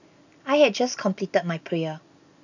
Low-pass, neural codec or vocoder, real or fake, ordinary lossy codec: 7.2 kHz; none; real; none